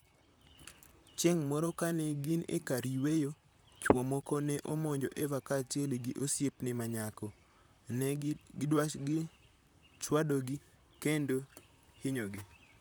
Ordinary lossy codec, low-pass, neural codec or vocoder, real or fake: none; none; vocoder, 44.1 kHz, 128 mel bands, Pupu-Vocoder; fake